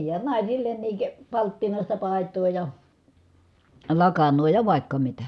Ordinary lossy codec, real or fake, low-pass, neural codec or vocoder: none; real; none; none